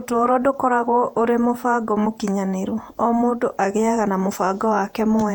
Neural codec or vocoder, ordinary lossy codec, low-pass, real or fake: vocoder, 48 kHz, 128 mel bands, Vocos; none; 19.8 kHz; fake